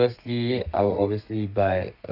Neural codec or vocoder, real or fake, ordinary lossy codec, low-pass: codec, 32 kHz, 1.9 kbps, SNAC; fake; none; 5.4 kHz